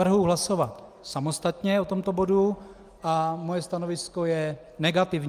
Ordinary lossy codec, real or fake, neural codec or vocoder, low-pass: Opus, 32 kbps; real; none; 14.4 kHz